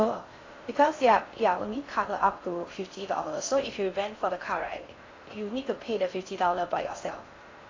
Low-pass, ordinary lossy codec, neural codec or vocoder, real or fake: 7.2 kHz; AAC, 32 kbps; codec, 16 kHz in and 24 kHz out, 0.6 kbps, FocalCodec, streaming, 4096 codes; fake